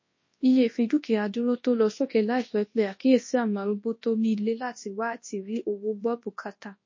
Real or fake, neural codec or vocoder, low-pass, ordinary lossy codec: fake; codec, 24 kHz, 0.9 kbps, WavTokenizer, large speech release; 7.2 kHz; MP3, 32 kbps